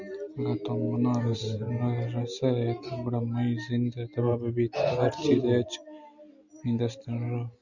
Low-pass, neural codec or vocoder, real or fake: 7.2 kHz; none; real